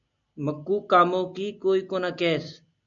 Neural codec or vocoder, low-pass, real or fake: none; 7.2 kHz; real